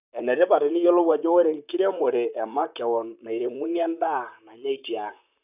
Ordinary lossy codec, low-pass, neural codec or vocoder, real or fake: none; 3.6 kHz; vocoder, 44.1 kHz, 128 mel bands, Pupu-Vocoder; fake